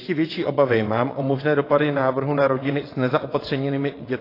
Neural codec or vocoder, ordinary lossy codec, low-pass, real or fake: vocoder, 22.05 kHz, 80 mel bands, WaveNeXt; AAC, 24 kbps; 5.4 kHz; fake